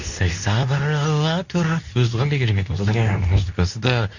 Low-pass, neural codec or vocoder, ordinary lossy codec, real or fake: 7.2 kHz; codec, 24 kHz, 0.9 kbps, WavTokenizer, medium speech release version 2; none; fake